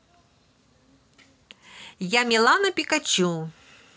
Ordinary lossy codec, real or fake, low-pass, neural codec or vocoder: none; real; none; none